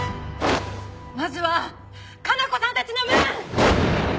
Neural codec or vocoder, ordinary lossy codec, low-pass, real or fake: none; none; none; real